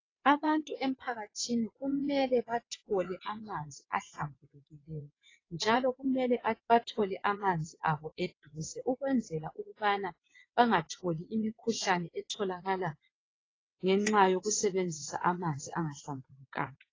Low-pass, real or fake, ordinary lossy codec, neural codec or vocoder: 7.2 kHz; fake; AAC, 32 kbps; vocoder, 22.05 kHz, 80 mel bands, WaveNeXt